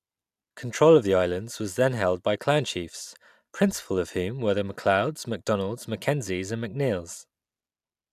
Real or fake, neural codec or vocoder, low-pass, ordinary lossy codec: real; none; 14.4 kHz; none